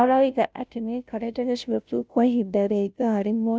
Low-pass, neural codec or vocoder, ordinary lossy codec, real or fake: none; codec, 16 kHz, 0.5 kbps, FunCodec, trained on Chinese and English, 25 frames a second; none; fake